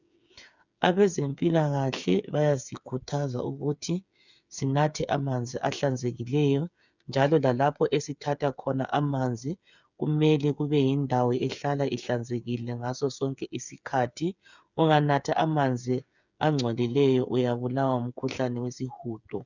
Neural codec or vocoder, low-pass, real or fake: codec, 16 kHz, 8 kbps, FreqCodec, smaller model; 7.2 kHz; fake